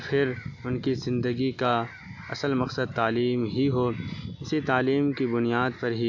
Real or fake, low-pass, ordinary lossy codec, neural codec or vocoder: real; 7.2 kHz; AAC, 48 kbps; none